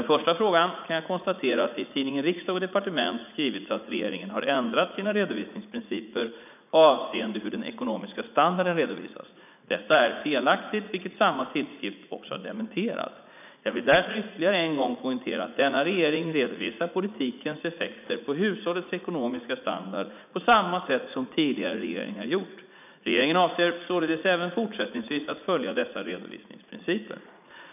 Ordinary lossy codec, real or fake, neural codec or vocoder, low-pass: none; fake; vocoder, 44.1 kHz, 80 mel bands, Vocos; 3.6 kHz